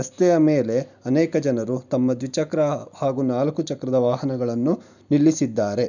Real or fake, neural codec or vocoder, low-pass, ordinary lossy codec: real; none; 7.2 kHz; none